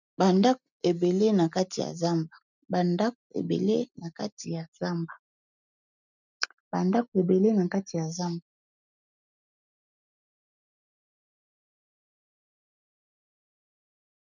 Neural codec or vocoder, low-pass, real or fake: none; 7.2 kHz; real